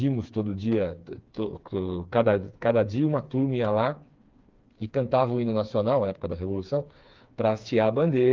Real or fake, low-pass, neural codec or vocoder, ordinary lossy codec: fake; 7.2 kHz; codec, 16 kHz, 4 kbps, FreqCodec, smaller model; Opus, 24 kbps